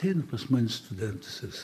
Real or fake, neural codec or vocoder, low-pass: fake; vocoder, 44.1 kHz, 128 mel bands, Pupu-Vocoder; 14.4 kHz